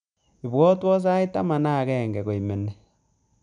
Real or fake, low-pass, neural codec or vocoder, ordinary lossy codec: real; 9.9 kHz; none; none